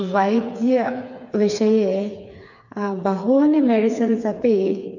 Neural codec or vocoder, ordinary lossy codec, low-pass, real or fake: codec, 16 kHz, 4 kbps, FreqCodec, smaller model; none; 7.2 kHz; fake